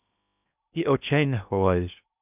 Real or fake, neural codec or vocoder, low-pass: fake; codec, 16 kHz in and 24 kHz out, 0.8 kbps, FocalCodec, streaming, 65536 codes; 3.6 kHz